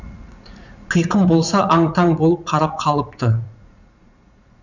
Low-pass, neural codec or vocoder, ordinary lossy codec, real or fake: 7.2 kHz; vocoder, 44.1 kHz, 80 mel bands, Vocos; none; fake